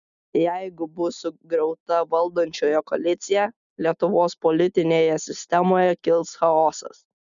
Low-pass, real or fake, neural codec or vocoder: 7.2 kHz; real; none